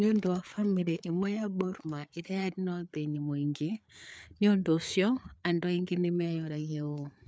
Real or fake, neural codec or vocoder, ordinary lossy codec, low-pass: fake; codec, 16 kHz, 4 kbps, FreqCodec, larger model; none; none